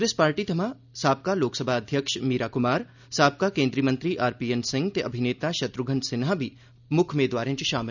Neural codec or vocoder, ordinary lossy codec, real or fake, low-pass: none; none; real; 7.2 kHz